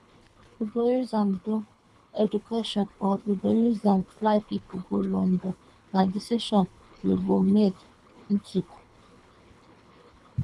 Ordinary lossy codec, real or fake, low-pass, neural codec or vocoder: none; fake; none; codec, 24 kHz, 3 kbps, HILCodec